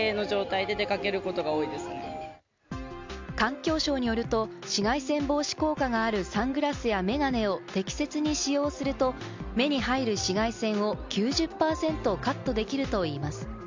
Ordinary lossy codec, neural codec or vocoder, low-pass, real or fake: MP3, 64 kbps; none; 7.2 kHz; real